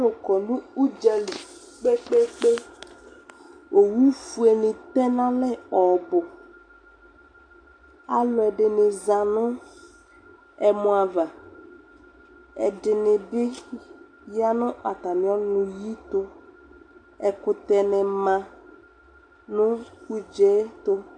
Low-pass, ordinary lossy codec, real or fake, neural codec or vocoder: 9.9 kHz; AAC, 64 kbps; real; none